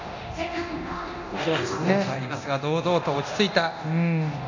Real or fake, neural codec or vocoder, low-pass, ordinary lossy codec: fake; codec, 24 kHz, 0.9 kbps, DualCodec; 7.2 kHz; none